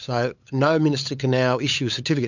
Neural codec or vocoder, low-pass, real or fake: codec, 16 kHz, 8 kbps, FunCodec, trained on LibriTTS, 25 frames a second; 7.2 kHz; fake